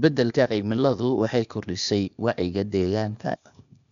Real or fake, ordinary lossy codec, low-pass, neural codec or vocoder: fake; none; 7.2 kHz; codec, 16 kHz, 0.8 kbps, ZipCodec